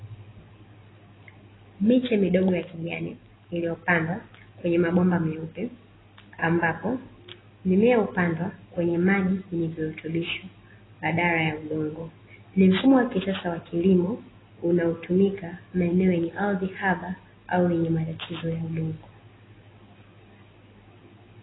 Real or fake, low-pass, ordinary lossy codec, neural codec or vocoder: real; 7.2 kHz; AAC, 16 kbps; none